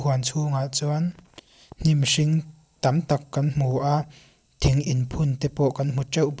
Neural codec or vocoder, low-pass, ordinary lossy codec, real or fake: none; none; none; real